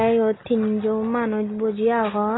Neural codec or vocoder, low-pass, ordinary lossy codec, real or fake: none; 7.2 kHz; AAC, 16 kbps; real